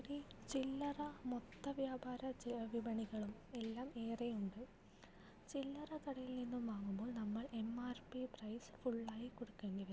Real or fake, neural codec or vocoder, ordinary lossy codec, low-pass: real; none; none; none